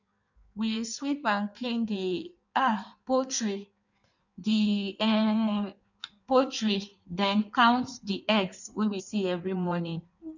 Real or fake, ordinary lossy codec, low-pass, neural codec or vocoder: fake; none; 7.2 kHz; codec, 16 kHz in and 24 kHz out, 1.1 kbps, FireRedTTS-2 codec